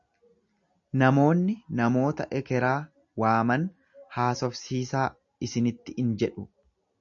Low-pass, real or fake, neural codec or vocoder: 7.2 kHz; real; none